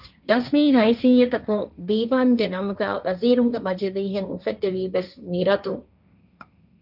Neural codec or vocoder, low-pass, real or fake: codec, 16 kHz, 1.1 kbps, Voila-Tokenizer; 5.4 kHz; fake